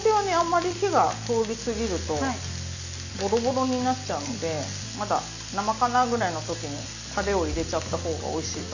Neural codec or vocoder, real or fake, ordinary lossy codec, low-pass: none; real; none; 7.2 kHz